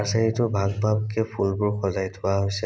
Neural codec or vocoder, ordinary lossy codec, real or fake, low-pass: none; none; real; none